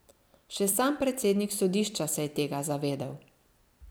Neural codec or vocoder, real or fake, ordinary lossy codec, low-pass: vocoder, 44.1 kHz, 128 mel bands every 256 samples, BigVGAN v2; fake; none; none